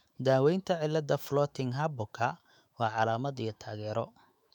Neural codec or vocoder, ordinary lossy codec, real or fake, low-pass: autoencoder, 48 kHz, 128 numbers a frame, DAC-VAE, trained on Japanese speech; none; fake; 19.8 kHz